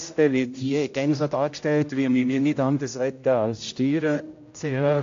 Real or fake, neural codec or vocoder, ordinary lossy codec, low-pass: fake; codec, 16 kHz, 0.5 kbps, X-Codec, HuBERT features, trained on general audio; AAC, 48 kbps; 7.2 kHz